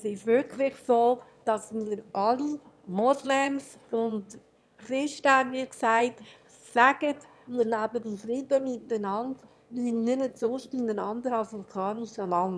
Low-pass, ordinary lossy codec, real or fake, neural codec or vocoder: none; none; fake; autoencoder, 22.05 kHz, a latent of 192 numbers a frame, VITS, trained on one speaker